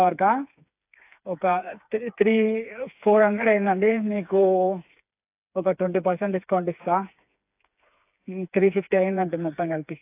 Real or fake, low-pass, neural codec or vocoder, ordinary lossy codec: fake; 3.6 kHz; codec, 16 kHz, 4 kbps, FreqCodec, smaller model; AAC, 32 kbps